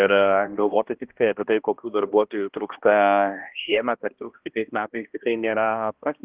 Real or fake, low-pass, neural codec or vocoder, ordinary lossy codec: fake; 3.6 kHz; codec, 16 kHz, 1 kbps, X-Codec, HuBERT features, trained on balanced general audio; Opus, 16 kbps